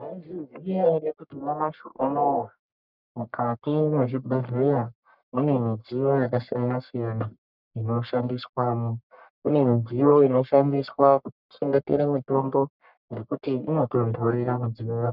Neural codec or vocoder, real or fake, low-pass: codec, 44.1 kHz, 1.7 kbps, Pupu-Codec; fake; 5.4 kHz